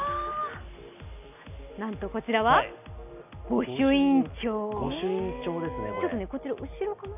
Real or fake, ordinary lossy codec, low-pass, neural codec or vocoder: real; none; 3.6 kHz; none